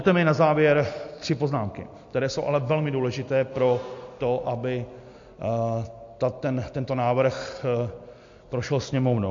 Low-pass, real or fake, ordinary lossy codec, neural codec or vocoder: 7.2 kHz; real; MP3, 48 kbps; none